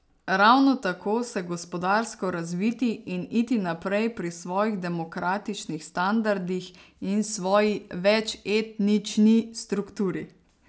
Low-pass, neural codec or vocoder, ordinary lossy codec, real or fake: none; none; none; real